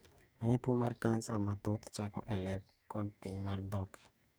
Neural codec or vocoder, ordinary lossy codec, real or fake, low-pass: codec, 44.1 kHz, 2.6 kbps, DAC; none; fake; none